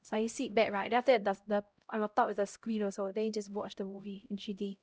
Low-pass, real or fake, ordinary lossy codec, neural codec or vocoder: none; fake; none; codec, 16 kHz, 0.5 kbps, X-Codec, HuBERT features, trained on LibriSpeech